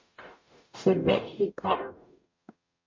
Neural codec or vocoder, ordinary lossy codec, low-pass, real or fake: codec, 44.1 kHz, 0.9 kbps, DAC; MP3, 48 kbps; 7.2 kHz; fake